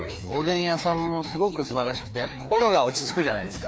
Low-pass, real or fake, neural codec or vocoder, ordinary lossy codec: none; fake; codec, 16 kHz, 2 kbps, FreqCodec, larger model; none